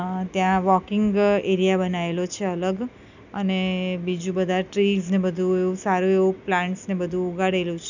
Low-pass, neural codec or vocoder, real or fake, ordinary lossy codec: 7.2 kHz; none; real; none